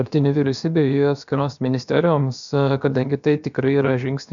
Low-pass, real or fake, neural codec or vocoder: 7.2 kHz; fake; codec, 16 kHz, about 1 kbps, DyCAST, with the encoder's durations